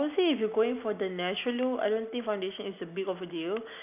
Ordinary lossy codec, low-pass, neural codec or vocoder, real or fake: none; 3.6 kHz; none; real